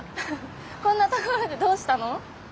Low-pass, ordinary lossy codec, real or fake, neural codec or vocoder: none; none; real; none